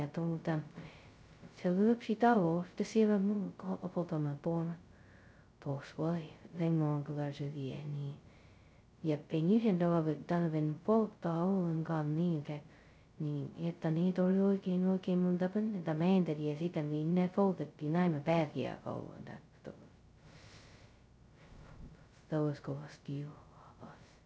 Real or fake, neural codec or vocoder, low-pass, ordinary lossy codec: fake; codec, 16 kHz, 0.2 kbps, FocalCodec; none; none